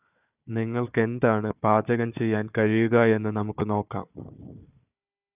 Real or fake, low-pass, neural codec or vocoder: fake; 3.6 kHz; codec, 16 kHz, 4 kbps, FunCodec, trained on Chinese and English, 50 frames a second